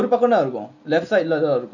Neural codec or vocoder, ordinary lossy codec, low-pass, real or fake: none; none; 7.2 kHz; real